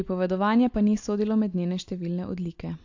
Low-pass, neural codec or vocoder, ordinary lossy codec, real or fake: 7.2 kHz; none; AAC, 48 kbps; real